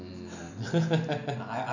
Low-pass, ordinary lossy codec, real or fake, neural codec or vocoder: 7.2 kHz; none; real; none